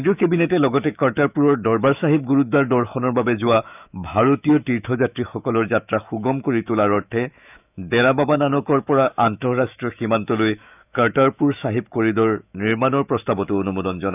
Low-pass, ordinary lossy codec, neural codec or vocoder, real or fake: 3.6 kHz; none; autoencoder, 48 kHz, 128 numbers a frame, DAC-VAE, trained on Japanese speech; fake